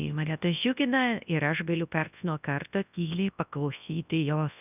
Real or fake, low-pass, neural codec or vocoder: fake; 3.6 kHz; codec, 24 kHz, 0.9 kbps, WavTokenizer, large speech release